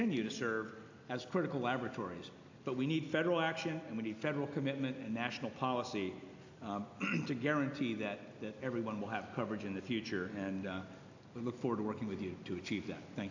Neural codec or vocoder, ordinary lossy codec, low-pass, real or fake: none; AAC, 48 kbps; 7.2 kHz; real